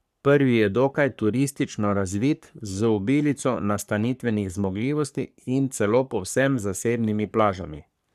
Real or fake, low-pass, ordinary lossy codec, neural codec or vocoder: fake; 14.4 kHz; none; codec, 44.1 kHz, 3.4 kbps, Pupu-Codec